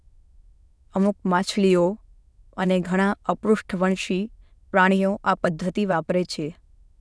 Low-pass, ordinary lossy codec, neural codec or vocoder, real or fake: none; none; autoencoder, 22.05 kHz, a latent of 192 numbers a frame, VITS, trained on many speakers; fake